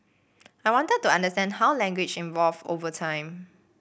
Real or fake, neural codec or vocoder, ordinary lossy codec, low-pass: real; none; none; none